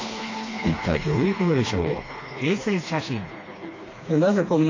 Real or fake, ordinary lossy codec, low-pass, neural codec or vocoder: fake; AAC, 32 kbps; 7.2 kHz; codec, 16 kHz, 2 kbps, FreqCodec, smaller model